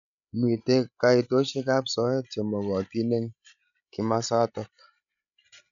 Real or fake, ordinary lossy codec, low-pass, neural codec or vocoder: fake; none; 7.2 kHz; codec, 16 kHz, 16 kbps, FreqCodec, larger model